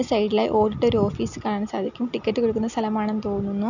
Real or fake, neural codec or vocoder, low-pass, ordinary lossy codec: real; none; 7.2 kHz; none